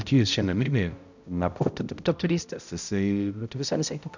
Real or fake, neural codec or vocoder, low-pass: fake; codec, 16 kHz, 0.5 kbps, X-Codec, HuBERT features, trained on balanced general audio; 7.2 kHz